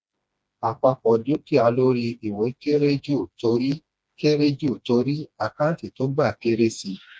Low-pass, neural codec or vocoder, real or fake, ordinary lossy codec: none; codec, 16 kHz, 2 kbps, FreqCodec, smaller model; fake; none